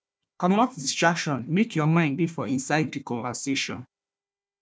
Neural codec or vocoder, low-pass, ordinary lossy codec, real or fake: codec, 16 kHz, 1 kbps, FunCodec, trained on Chinese and English, 50 frames a second; none; none; fake